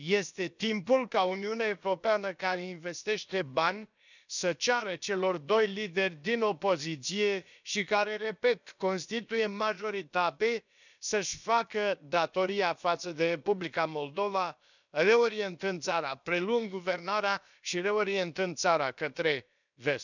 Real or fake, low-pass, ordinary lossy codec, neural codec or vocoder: fake; 7.2 kHz; none; codec, 16 kHz, about 1 kbps, DyCAST, with the encoder's durations